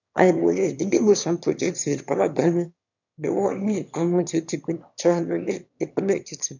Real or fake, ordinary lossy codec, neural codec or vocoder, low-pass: fake; none; autoencoder, 22.05 kHz, a latent of 192 numbers a frame, VITS, trained on one speaker; 7.2 kHz